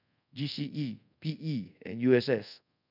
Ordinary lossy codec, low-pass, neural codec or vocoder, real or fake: none; 5.4 kHz; codec, 24 kHz, 0.5 kbps, DualCodec; fake